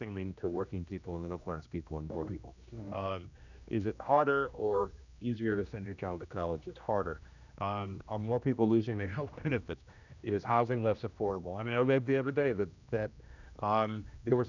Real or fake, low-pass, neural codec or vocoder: fake; 7.2 kHz; codec, 16 kHz, 1 kbps, X-Codec, HuBERT features, trained on general audio